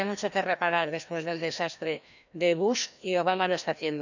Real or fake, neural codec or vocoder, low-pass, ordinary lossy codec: fake; codec, 16 kHz, 1 kbps, FreqCodec, larger model; 7.2 kHz; none